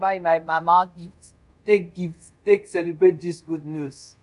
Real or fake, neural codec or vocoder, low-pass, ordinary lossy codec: fake; codec, 24 kHz, 0.5 kbps, DualCodec; 10.8 kHz; none